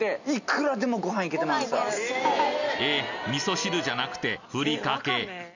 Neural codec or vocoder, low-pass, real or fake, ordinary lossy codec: none; 7.2 kHz; real; none